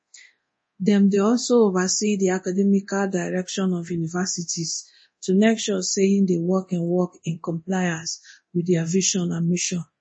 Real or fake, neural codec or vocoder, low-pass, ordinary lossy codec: fake; codec, 24 kHz, 0.9 kbps, DualCodec; 9.9 kHz; MP3, 32 kbps